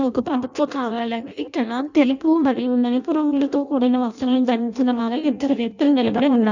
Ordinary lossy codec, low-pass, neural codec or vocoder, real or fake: none; 7.2 kHz; codec, 16 kHz in and 24 kHz out, 0.6 kbps, FireRedTTS-2 codec; fake